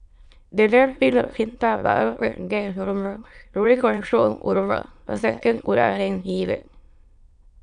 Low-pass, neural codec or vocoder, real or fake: 9.9 kHz; autoencoder, 22.05 kHz, a latent of 192 numbers a frame, VITS, trained on many speakers; fake